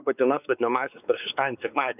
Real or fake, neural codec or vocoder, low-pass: fake; codec, 16 kHz, 4 kbps, X-Codec, WavLM features, trained on Multilingual LibriSpeech; 3.6 kHz